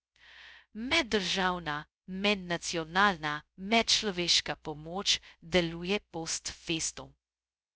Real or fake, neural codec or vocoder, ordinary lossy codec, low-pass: fake; codec, 16 kHz, 0.2 kbps, FocalCodec; none; none